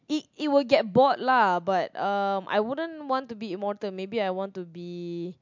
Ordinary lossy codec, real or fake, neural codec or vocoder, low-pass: MP3, 64 kbps; real; none; 7.2 kHz